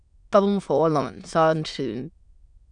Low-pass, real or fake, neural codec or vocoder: 9.9 kHz; fake; autoencoder, 22.05 kHz, a latent of 192 numbers a frame, VITS, trained on many speakers